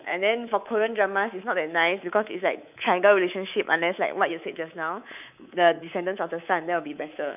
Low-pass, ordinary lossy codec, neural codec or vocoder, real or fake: 3.6 kHz; none; codec, 24 kHz, 3.1 kbps, DualCodec; fake